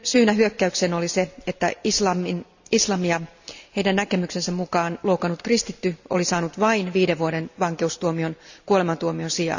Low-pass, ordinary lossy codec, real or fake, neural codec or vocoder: 7.2 kHz; none; real; none